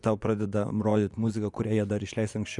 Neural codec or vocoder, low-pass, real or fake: vocoder, 24 kHz, 100 mel bands, Vocos; 10.8 kHz; fake